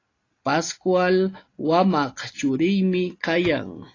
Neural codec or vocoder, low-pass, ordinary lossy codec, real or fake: none; 7.2 kHz; AAC, 32 kbps; real